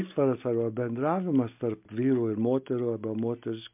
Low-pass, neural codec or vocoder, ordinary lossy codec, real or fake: 3.6 kHz; none; MP3, 32 kbps; real